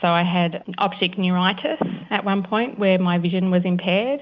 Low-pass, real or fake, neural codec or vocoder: 7.2 kHz; real; none